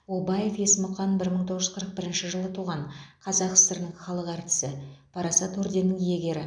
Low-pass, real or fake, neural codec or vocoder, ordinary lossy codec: 9.9 kHz; real; none; none